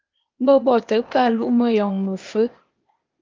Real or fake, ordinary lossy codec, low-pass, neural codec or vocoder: fake; Opus, 24 kbps; 7.2 kHz; codec, 16 kHz, 0.8 kbps, ZipCodec